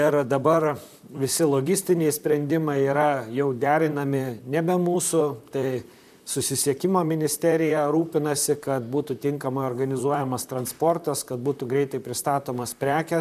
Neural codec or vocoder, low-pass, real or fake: vocoder, 44.1 kHz, 128 mel bands, Pupu-Vocoder; 14.4 kHz; fake